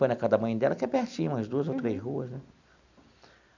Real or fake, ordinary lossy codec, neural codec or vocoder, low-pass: real; none; none; 7.2 kHz